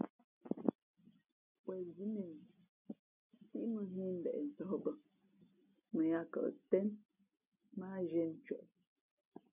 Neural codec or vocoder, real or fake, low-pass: none; real; 3.6 kHz